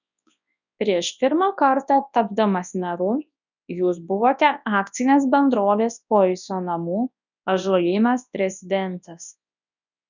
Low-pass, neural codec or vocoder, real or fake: 7.2 kHz; codec, 24 kHz, 0.9 kbps, WavTokenizer, large speech release; fake